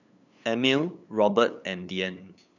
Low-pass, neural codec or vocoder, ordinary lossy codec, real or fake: 7.2 kHz; codec, 16 kHz, 8 kbps, FunCodec, trained on LibriTTS, 25 frames a second; none; fake